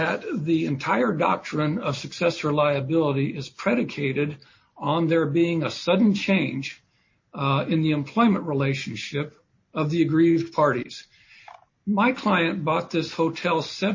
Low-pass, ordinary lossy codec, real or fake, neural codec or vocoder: 7.2 kHz; MP3, 32 kbps; real; none